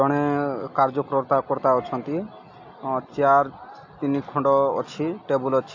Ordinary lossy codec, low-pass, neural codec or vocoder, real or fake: AAC, 48 kbps; 7.2 kHz; none; real